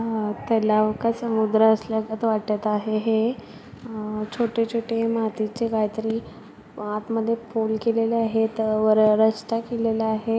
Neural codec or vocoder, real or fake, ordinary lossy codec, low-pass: none; real; none; none